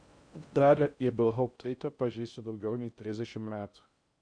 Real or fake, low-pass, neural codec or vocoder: fake; 9.9 kHz; codec, 16 kHz in and 24 kHz out, 0.6 kbps, FocalCodec, streaming, 2048 codes